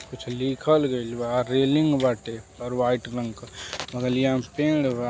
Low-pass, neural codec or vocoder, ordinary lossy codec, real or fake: none; none; none; real